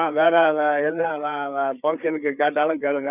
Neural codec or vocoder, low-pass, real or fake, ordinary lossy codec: codec, 16 kHz, 4 kbps, FreqCodec, larger model; 3.6 kHz; fake; none